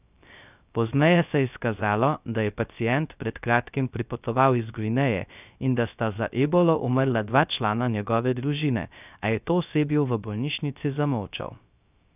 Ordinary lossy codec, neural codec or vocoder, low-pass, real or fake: none; codec, 16 kHz, 0.3 kbps, FocalCodec; 3.6 kHz; fake